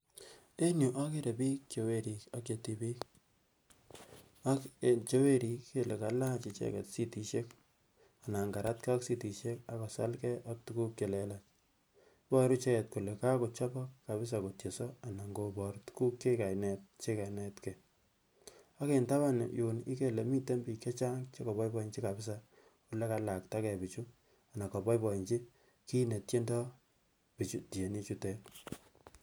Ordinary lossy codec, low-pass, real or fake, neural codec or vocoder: none; none; real; none